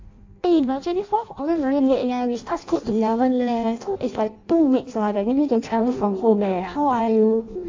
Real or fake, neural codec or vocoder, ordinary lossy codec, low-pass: fake; codec, 16 kHz in and 24 kHz out, 0.6 kbps, FireRedTTS-2 codec; AAC, 48 kbps; 7.2 kHz